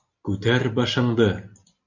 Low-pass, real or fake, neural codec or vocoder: 7.2 kHz; real; none